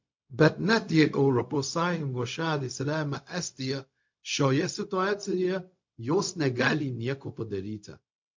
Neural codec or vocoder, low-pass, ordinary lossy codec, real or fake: codec, 16 kHz, 0.4 kbps, LongCat-Audio-Codec; 7.2 kHz; MP3, 48 kbps; fake